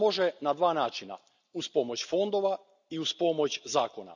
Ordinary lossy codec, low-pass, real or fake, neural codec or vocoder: none; 7.2 kHz; real; none